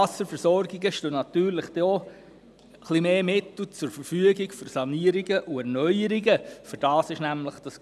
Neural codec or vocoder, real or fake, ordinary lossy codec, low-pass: none; real; none; none